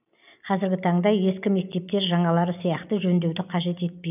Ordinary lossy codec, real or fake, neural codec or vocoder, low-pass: none; real; none; 3.6 kHz